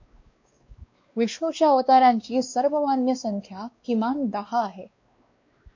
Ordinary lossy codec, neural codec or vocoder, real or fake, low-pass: MP3, 48 kbps; codec, 16 kHz, 2 kbps, X-Codec, WavLM features, trained on Multilingual LibriSpeech; fake; 7.2 kHz